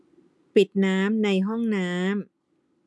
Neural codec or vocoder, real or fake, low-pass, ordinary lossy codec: none; real; none; none